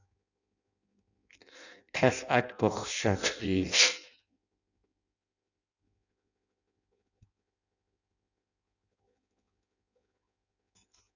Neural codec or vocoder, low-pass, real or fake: codec, 16 kHz in and 24 kHz out, 0.6 kbps, FireRedTTS-2 codec; 7.2 kHz; fake